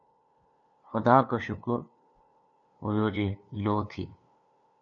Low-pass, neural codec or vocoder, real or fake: 7.2 kHz; codec, 16 kHz, 2 kbps, FunCodec, trained on LibriTTS, 25 frames a second; fake